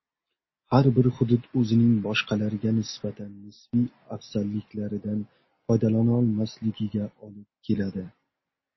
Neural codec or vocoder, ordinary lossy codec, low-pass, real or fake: none; MP3, 24 kbps; 7.2 kHz; real